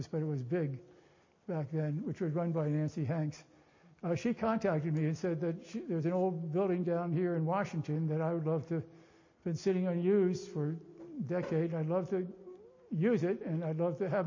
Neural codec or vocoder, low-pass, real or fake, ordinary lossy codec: none; 7.2 kHz; real; MP3, 32 kbps